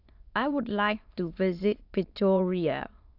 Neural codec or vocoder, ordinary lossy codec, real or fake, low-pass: autoencoder, 22.05 kHz, a latent of 192 numbers a frame, VITS, trained on many speakers; AAC, 48 kbps; fake; 5.4 kHz